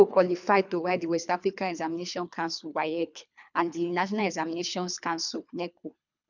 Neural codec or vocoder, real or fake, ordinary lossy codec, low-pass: codec, 24 kHz, 3 kbps, HILCodec; fake; none; 7.2 kHz